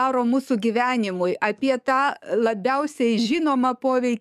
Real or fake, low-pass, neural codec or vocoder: fake; 14.4 kHz; codec, 44.1 kHz, 7.8 kbps, DAC